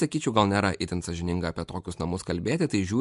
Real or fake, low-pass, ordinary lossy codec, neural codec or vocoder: real; 10.8 kHz; MP3, 64 kbps; none